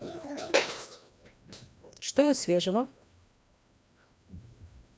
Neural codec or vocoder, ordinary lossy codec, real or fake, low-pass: codec, 16 kHz, 1 kbps, FreqCodec, larger model; none; fake; none